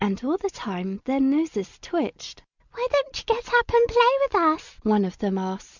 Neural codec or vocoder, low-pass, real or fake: none; 7.2 kHz; real